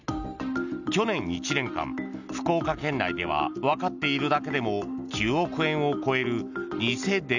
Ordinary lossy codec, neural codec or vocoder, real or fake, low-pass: none; none; real; 7.2 kHz